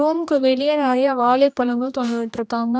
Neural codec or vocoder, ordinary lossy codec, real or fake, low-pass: codec, 16 kHz, 1 kbps, X-Codec, HuBERT features, trained on general audio; none; fake; none